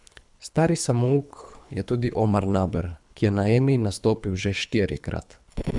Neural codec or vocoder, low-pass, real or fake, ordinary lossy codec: codec, 24 kHz, 3 kbps, HILCodec; 10.8 kHz; fake; none